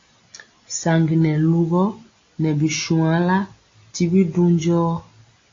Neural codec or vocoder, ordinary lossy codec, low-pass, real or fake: none; AAC, 32 kbps; 7.2 kHz; real